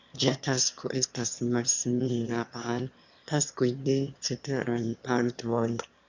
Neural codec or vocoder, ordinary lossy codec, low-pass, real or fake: autoencoder, 22.05 kHz, a latent of 192 numbers a frame, VITS, trained on one speaker; Opus, 64 kbps; 7.2 kHz; fake